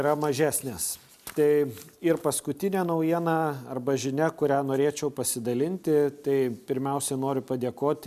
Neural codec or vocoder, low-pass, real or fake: none; 14.4 kHz; real